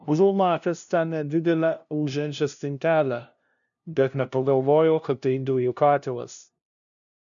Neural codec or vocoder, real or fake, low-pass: codec, 16 kHz, 0.5 kbps, FunCodec, trained on LibriTTS, 25 frames a second; fake; 7.2 kHz